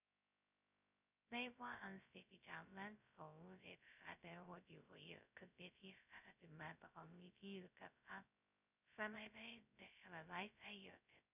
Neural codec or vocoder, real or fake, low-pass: codec, 16 kHz, 0.2 kbps, FocalCodec; fake; 3.6 kHz